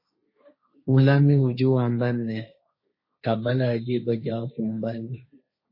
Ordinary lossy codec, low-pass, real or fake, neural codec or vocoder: MP3, 24 kbps; 5.4 kHz; fake; codec, 16 kHz, 1.1 kbps, Voila-Tokenizer